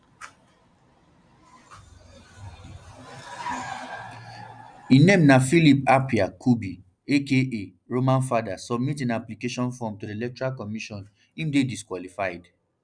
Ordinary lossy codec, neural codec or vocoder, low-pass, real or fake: none; none; 9.9 kHz; real